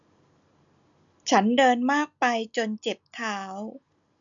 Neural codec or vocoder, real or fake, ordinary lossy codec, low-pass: none; real; none; 7.2 kHz